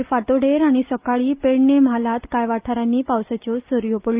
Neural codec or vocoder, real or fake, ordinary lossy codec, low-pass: none; real; Opus, 24 kbps; 3.6 kHz